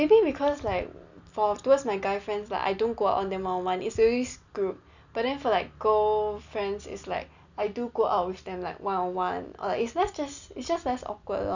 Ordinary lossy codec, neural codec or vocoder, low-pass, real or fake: none; none; 7.2 kHz; real